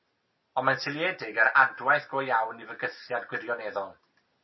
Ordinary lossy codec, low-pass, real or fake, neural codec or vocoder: MP3, 24 kbps; 7.2 kHz; real; none